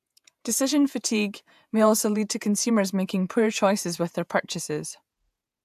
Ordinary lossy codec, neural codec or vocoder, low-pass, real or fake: AAC, 96 kbps; vocoder, 48 kHz, 128 mel bands, Vocos; 14.4 kHz; fake